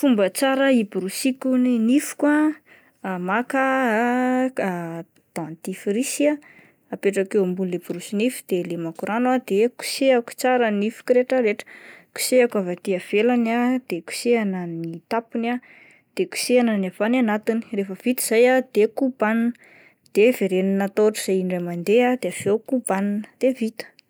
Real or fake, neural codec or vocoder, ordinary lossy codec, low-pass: real; none; none; none